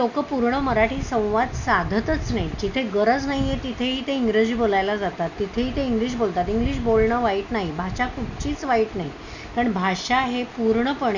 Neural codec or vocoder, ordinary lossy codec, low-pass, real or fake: none; none; 7.2 kHz; real